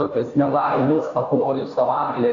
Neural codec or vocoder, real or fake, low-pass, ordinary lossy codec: codec, 16 kHz, 0.5 kbps, FunCodec, trained on Chinese and English, 25 frames a second; fake; 7.2 kHz; MP3, 48 kbps